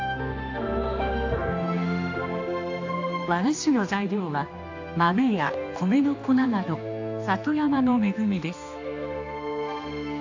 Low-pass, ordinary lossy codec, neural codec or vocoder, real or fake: 7.2 kHz; AAC, 48 kbps; codec, 16 kHz, 2 kbps, X-Codec, HuBERT features, trained on general audio; fake